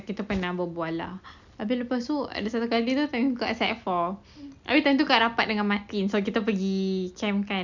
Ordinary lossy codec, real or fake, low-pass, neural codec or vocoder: none; real; 7.2 kHz; none